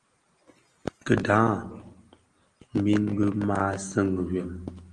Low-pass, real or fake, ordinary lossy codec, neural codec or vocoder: 9.9 kHz; real; Opus, 24 kbps; none